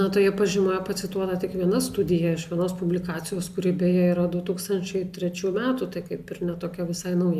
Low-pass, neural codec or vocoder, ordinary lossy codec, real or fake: 14.4 kHz; none; AAC, 96 kbps; real